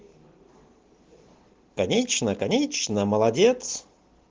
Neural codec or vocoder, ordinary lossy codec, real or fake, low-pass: none; Opus, 16 kbps; real; 7.2 kHz